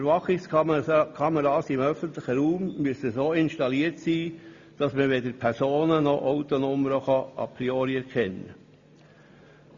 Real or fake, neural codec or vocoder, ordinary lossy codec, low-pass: real; none; Opus, 64 kbps; 7.2 kHz